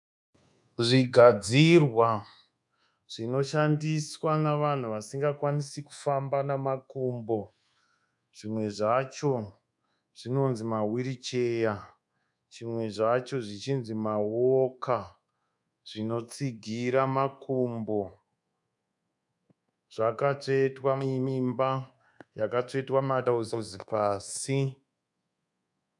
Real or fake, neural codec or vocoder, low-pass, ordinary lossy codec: fake; codec, 24 kHz, 1.2 kbps, DualCodec; 10.8 kHz; MP3, 96 kbps